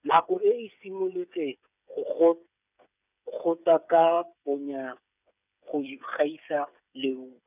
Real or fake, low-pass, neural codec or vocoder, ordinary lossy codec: fake; 3.6 kHz; codec, 16 kHz, 8 kbps, FreqCodec, smaller model; none